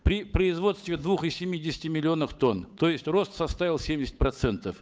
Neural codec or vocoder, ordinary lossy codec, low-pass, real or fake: codec, 16 kHz, 8 kbps, FunCodec, trained on Chinese and English, 25 frames a second; none; none; fake